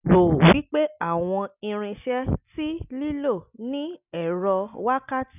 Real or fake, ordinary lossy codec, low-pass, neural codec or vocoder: real; none; 3.6 kHz; none